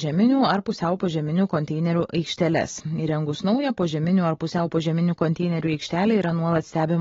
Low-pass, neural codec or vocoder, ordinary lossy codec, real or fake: 7.2 kHz; none; AAC, 24 kbps; real